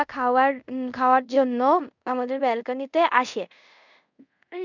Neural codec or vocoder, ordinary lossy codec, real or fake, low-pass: codec, 24 kHz, 0.5 kbps, DualCodec; none; fake; 7.2 kHz